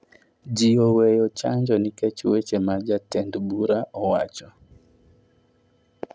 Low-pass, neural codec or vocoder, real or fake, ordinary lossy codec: none; none; real; none